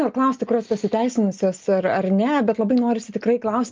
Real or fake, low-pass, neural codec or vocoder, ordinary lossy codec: real; 7.2 kHz; none; Opus, 24 kbps